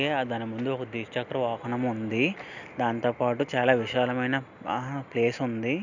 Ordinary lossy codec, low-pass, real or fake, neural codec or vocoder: none; 7.2 kHz; real; none